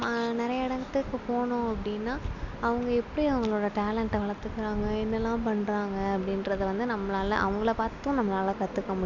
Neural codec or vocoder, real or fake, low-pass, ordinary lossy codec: none; real; 7.2 kHz; none